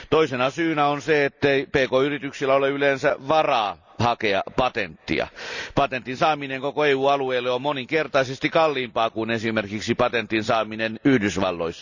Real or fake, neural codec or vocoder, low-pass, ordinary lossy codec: real; none; 7.2 kHz; none